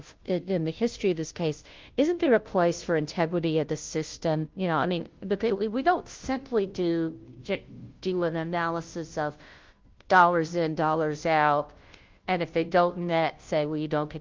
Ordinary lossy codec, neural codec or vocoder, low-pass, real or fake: Opus, 32 kbps; codec, 16 kHz, 0.5 kbps, FunCodec, trained on Chinese and English, 25 frames a second; 7.2 kHz; fake